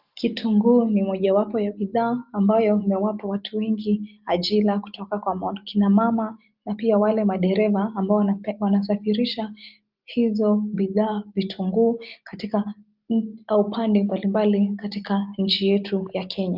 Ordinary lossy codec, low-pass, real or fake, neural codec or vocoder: Opus, 24 kbps; 5.4 kHz; real; none